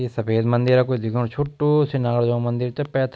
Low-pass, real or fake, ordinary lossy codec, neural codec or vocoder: none; real; none; none